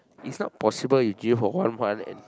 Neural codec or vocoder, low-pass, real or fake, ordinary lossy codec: none; none; real; none